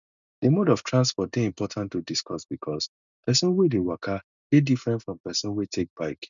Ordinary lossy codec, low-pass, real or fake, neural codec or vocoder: none; 7.2 kHz; real; none